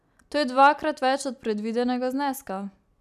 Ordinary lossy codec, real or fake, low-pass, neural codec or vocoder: none; real; 14.4 kHz; none